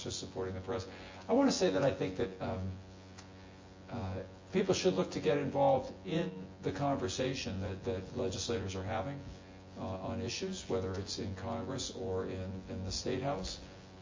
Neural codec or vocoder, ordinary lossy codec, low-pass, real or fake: vocoder, 24 kHz, 100 mel bands, Vocos; MP3, 32 kbps; 7.2 kHz; fake